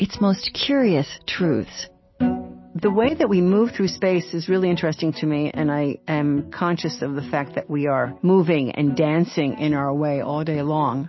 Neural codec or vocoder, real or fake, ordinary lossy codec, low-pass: none; real; MP3, 24 kbps; 7.2 kHz